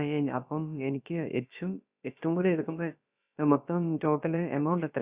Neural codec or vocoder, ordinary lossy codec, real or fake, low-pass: codec, 16 kHz, about 1 kbps, DyCAST, with the encoder's durations; Opus, 64 kbps; fake; 3.6 kHz